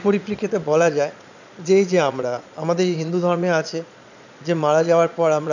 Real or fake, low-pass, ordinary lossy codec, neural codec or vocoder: fake; 7.2 kHz; none; vocoder, 22.05 kHz, 80 mel bands, Vocos